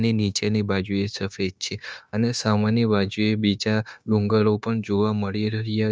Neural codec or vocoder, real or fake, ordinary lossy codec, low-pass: codec, 16 kHz, 0.9 kbps, LongCat-Audio-Codec; fake; none; none